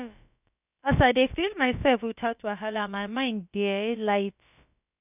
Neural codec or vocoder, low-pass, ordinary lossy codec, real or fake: codec, 16 kHz, about 1 kbps, DyCAST, with the encoder's durations; 3.6 kHz; none; fake